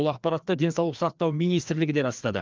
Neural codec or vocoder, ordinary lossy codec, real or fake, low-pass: codec, 16 kHz, 2 kbps, FreqCodec, larger model; Opus, 24 kbps; fake; 7.2 kHz